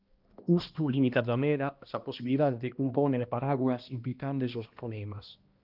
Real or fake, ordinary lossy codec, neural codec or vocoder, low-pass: fake; Opus, 32 kbps; codec, 16 kHz, 1 kbps, X-Codec, HuBERT features, trained on balanced general audio; 5.4 kHz